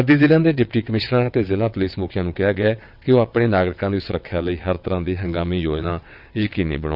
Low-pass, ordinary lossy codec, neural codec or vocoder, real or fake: 5.4 kHz; none; vocoder, 22.05 kHz, 80 mel bands, WaveNeXt; fake